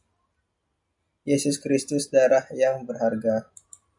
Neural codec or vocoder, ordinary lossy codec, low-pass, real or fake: none; MP3, 96 kbps; 10.8 kHz; real